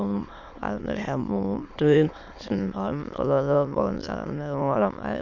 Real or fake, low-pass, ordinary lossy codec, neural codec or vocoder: fake; 7.2 kHz; MP3, 64 kbps; autoencoder, 22.05 kHz, a latent of 192 numbers a frame, VITS, trained on many speakers